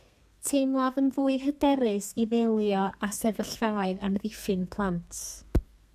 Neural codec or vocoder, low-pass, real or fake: codec, 32 kHz, 1.9 kbps, SNAC; 14.4 kHz; fake